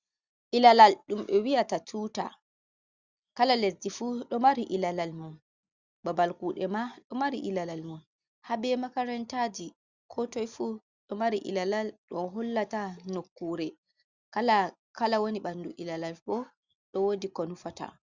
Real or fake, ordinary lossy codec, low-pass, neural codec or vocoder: real; Opus, 64 kbps; 7.2 kHz; none